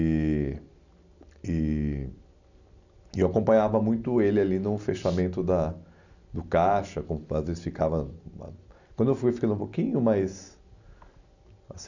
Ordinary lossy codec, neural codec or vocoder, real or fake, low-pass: none; none; real; 7.2 kHz